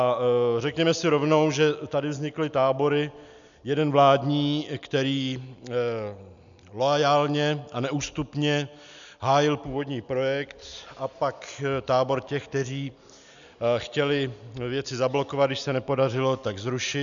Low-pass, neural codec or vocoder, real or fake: 7.2 kHz; none; real